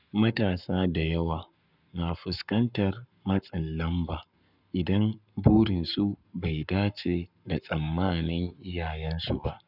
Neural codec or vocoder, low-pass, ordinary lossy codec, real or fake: codec, 44.1 kHz, 7.8 kbps, Pupu-Codec; 5.4 kHz; none; fake